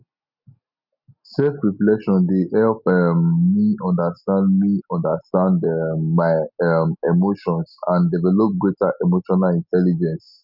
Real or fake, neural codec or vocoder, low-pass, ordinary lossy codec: real; none; 5.4 kHz; none